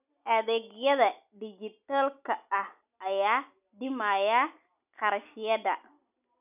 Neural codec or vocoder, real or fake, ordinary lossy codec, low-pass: none; real; none; 3.6 kHz